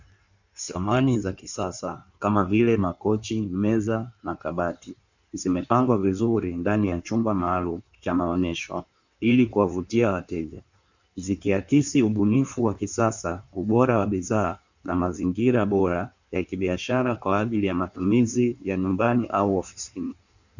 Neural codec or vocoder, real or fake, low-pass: codec, 16 kHz in and 24 kHz out, 1.1 kbps, FireRedTTS-2 codec; fake; 7.2 kHz